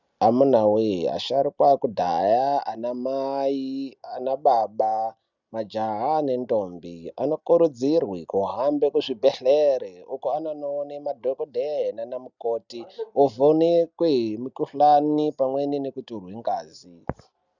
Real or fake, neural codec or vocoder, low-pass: real; none; 7.2 kHz